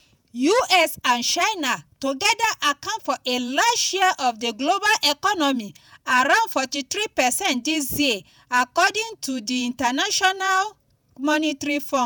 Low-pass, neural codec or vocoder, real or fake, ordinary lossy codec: none; vocoder, 48 kHz, 128 mel bands, Vocos; fake; none